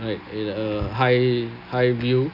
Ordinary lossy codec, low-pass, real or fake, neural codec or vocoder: none; 5.4 kHz; real; none